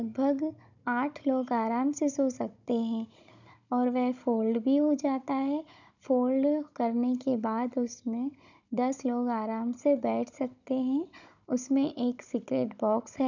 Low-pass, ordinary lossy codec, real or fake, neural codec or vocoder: 7.2 kHz; none; fake; codec, 16 kHz, 16 kbps, FunCodec, trained on Chinese and English, 50 frames a second